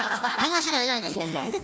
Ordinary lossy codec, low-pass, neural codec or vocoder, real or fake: none; none; codec, 16 kHz, 1 kbps, FunCodec, trained on Chinese and English, 50 frames a second; fake